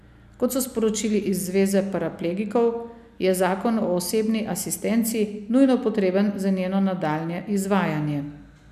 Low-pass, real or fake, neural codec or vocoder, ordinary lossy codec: 14.4 kHz; real; none; none